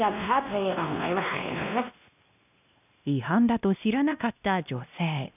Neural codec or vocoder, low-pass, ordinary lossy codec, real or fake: codec, 16 kHz, 1 kbps, X-Codec, WavLM features, trained on Multilingual LibriSpeech; 3.6 kHz; none; fake